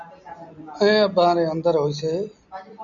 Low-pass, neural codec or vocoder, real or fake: 7.2 kHz; none; real